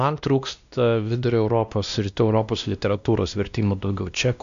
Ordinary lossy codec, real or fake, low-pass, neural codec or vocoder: AAC, 96 kbps; fake; 7.2 kHz; codec, 16 kHz, 1 kbps, X-Codec, WavLM features, trained on Multilingual LibriSpeech